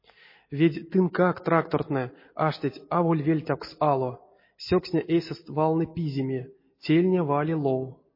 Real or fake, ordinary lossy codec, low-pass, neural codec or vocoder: real; MP3, 24 kbps; 5.4 kHz; none